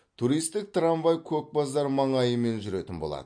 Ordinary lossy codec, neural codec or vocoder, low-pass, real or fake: MP3, 48 kbps; none; 9.9 kHz; real